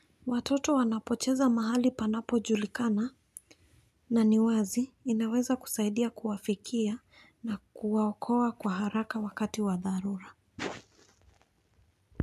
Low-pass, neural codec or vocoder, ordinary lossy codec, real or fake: 14.4 kHz; none; none; real